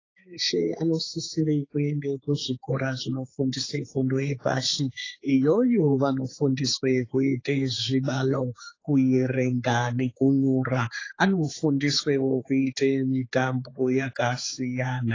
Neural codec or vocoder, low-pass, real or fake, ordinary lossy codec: codec, 16 kHz, 4 kbps, X-Codec, HuBERT features, trained on general audio; 7.2 kHz; fake; AAC, 32 kbps